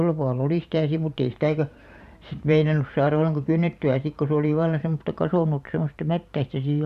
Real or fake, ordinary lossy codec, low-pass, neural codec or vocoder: fake; none; 14.4 kHz; vocoder, 44.1 kHz, 128 mel bands every 512 samples, BigVGAN v2